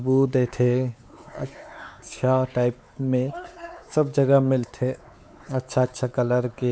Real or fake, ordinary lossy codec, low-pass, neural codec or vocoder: fake; none; none; codec, 16 kHz, 4 kbps, X-Codec, WavLM features, trained on Multilingual LibriSpeech